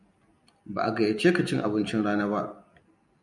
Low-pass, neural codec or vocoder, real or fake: 10.8 kHz; none; real